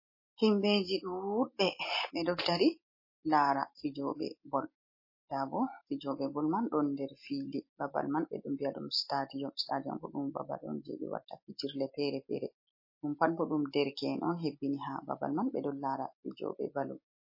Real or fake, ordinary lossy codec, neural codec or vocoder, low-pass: real; MP3, 24 kbps; none; 5.4 kHz